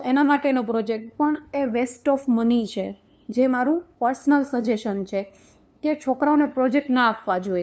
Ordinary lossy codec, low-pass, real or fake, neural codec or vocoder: none; none; fake; codec, 16 kHz, 2 kbps, FunCodec, trained on LibriTTS, 25 frames a second